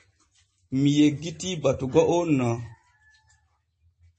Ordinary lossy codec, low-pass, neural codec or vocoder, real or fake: MP3, 32 kbps; 10.8 kHz; none; real